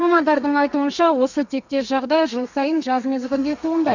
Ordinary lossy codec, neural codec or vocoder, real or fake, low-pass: none; codec, 32 kHz, 1.9 kbps, SNAC; fake; 7.2 kHz